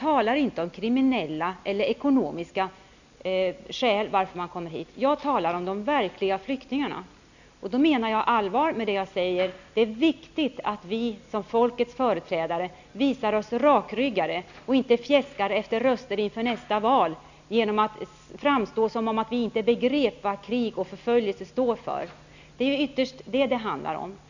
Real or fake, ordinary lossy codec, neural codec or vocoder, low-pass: real; none; none; 7.2 kHz